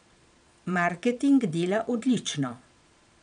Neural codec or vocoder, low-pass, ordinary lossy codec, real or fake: vocoder, 22.05 kHz, 80 mel bands, Vocos; 9.9 kHz; none; fake